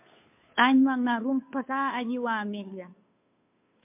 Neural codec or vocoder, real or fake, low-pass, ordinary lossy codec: codec, 16 kHz, 2 kbps, FunCodec, trained on Chinese and English, 25 frames a second; fake; 3.6 kHz; MP3, 32 kbps